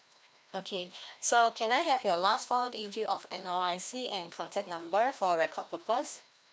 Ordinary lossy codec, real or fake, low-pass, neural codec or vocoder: none; fake; none; codec, 16 kHz, 1 kbps, FreqCodec, larger model